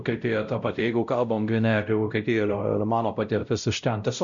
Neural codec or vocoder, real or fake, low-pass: codec, 16 kHz, 0.5 kbps, X-Codec, WavLM features, trained on Multilingual LibriSpeech; fake; 7.2 kHz